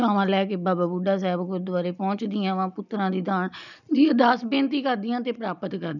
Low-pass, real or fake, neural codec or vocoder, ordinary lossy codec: 7.2 kHz; real; none; none